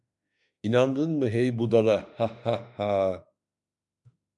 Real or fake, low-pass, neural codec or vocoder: fake; 10.8 kHz; autoencoder, 48 kHz, 32 numbers a frame, DAC-VAE, trained on Japanese speech